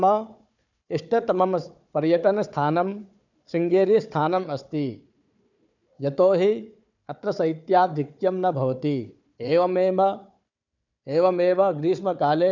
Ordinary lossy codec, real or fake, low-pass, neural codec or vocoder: none; fake; 7.2 kHz; codec, 16 kHz, 4 kbps, FunCodec, trained on Chinese and English, 50 frames a second